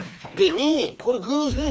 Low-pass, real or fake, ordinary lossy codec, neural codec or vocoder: none; fake; none; codec, 16 kHz, 2 kbps, FreqCodec, larger model